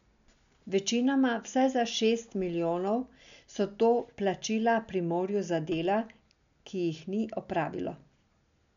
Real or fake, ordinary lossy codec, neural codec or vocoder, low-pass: real; none; none; 7.2 kHz